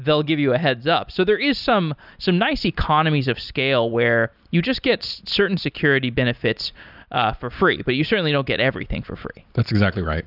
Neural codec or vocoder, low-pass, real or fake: none; 5.4 kHz; real